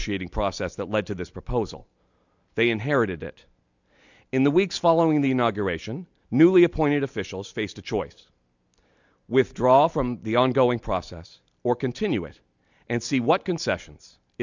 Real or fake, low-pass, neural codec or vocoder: real; 7.2 kHz; none